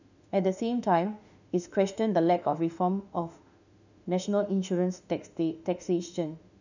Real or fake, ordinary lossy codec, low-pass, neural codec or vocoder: fake; none; 7.2 kHz; autoencoder, 48 kHz, 32 numbers a frame, DAC-VAE, trained on Japanese speech